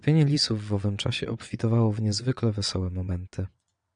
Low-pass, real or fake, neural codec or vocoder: 9.9 kHz; fake; vocoder, 22.05 kHz, 80 mel bands, WaveNeXt